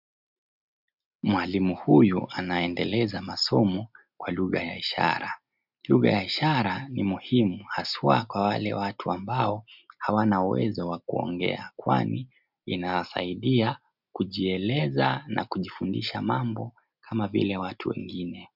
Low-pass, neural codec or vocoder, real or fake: 5.4 kHz; none; real